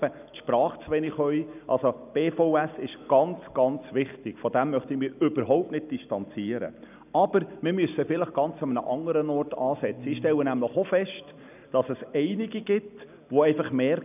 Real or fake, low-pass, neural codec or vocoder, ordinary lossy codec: real; 3.6 kHz; none; none